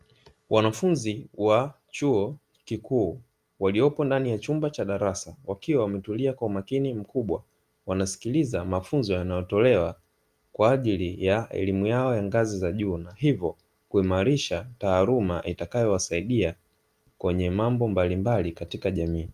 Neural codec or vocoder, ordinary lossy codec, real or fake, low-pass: none; Opus, 32 kbps; real; 14.4 kHz